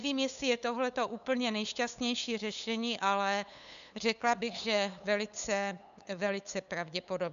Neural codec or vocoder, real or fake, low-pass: codec, 16 kHz, 8 kbps, FunCodec, trained on LibriTTS, 25 frames a second; fake; 7.2 kHz